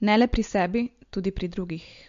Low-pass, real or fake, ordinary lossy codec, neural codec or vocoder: 7.2 kHz; real; MP3, 48 kbps; none